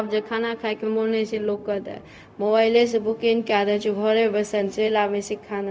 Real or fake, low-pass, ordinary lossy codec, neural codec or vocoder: fake; none; none; codec, 16 kHz, 0.4 kbps, LongCat-Audio-Codec